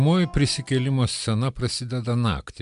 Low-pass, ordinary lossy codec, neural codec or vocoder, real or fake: 10.8 kHz; MP3, 96 kbps; none; real